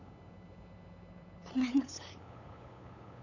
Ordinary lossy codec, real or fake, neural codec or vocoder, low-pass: none; fake; codec, 16 kHz, 8 kbps, FunCodec, trained on LibriTTS, 25 frames a second; 7.2 kHz